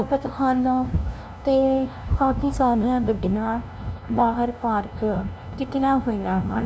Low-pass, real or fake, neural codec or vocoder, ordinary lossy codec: none; fake; codec, 16 kHz, 0.5 kbps, FunCodec, trained on LibriTTS, 25 frames a second; none